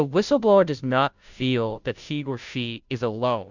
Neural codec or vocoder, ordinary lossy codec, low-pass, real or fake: codec, 16 kHz, 0.5 kbps, FunCodec, trained on Chinese and English, 25 frames a second; Opus, 64 kbps; 7.2 kHz; fake